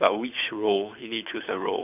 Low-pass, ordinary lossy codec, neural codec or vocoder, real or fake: 3.6 kHz; none; codec, 24 kHz, 6 kbps, HILCodec; fake